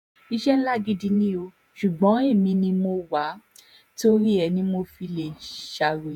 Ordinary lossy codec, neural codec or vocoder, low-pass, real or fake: none; vocoder, 48 kHz, 128 mel bands, Vocos; 19.8 kHz; fake